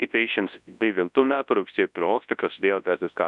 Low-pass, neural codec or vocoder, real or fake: 10.8 kHz; codec, 24 kHz, 0.9 kbps, WavTokenizer, large speech release; fake